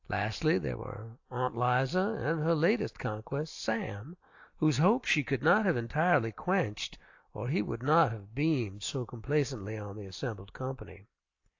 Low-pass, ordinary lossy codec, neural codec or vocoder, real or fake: 7.2 kHz; AAC, 48 kbps; none; real